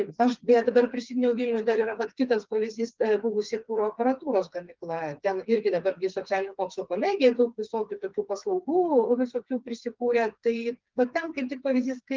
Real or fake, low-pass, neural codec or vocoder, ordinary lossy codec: fake; 7.2 kHz; codec, 16 kHz, 4 kbps, FreqCodec, smaller model; Opus, 32 kbps